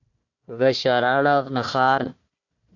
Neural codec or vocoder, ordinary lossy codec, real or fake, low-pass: codec, 16 kHz, 1 kbps, FunCodec, trained on Chinese and English, 50 frames a second; AAC, 48 kbps; fake; 7.2 kHz